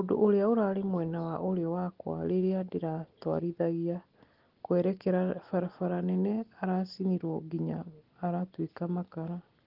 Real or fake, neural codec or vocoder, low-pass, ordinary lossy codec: real; none; 5.4 kHz; Opus, 16 kbps